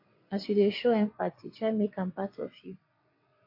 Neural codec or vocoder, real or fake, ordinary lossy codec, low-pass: vocoder, 22.05 kHz, 80 mel bands, WaveNeXt; fake; MP3, 32 kbps; 5.4 kHz